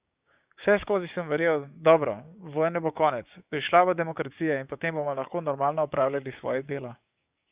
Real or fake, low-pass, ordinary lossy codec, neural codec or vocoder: fake; 3.6 kHz; Opus, 64 kbps; codec, 16 kHz, 6 kbps, DAC